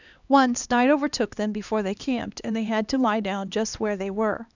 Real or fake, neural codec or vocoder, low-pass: fake; codec, 16 kHz, 2 kbps, X-Codec, HuBERT features, trained on LibriSpeech; 7.2 kHz